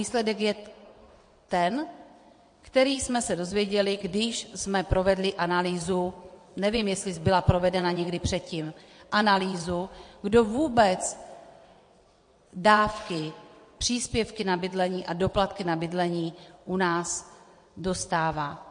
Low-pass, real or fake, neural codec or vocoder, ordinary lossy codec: 9.9 kHz; fake; vocoder, 22.05 kHz, 80 mel bands, WaveNeXt; MP3, 48 kbps